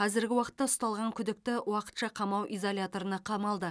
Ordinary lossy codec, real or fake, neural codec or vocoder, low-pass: none; real; none; none